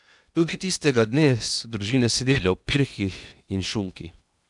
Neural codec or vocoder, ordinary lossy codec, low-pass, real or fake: codec, 16 kHz in and 24 kHz out, 0.8 kbps, FocalCodec, streaming, 65536 codes; none; 10.8 kHz; fake